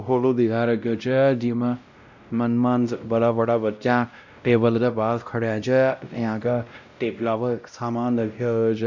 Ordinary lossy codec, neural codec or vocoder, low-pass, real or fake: none; codec, 16 kHz, 0.5 kbps, X-Codec, WavLM features, trained on Multilingual LibriSpeech; 7.2 kHz; fake